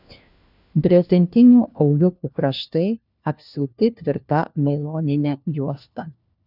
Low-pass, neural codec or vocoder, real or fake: 5.4 kHz; codec, 16 kHz, 1 kbps, FunCodec, trained on LibriTTS, 50 frames a second; fake